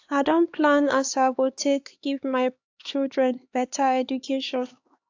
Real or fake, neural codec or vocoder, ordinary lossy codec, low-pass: fake; codec, 16 kHz, 2 kbps, X-Codec, HuBERT features, trained on LibriSpeech; AAC, 48 kbps; 7.2 kHz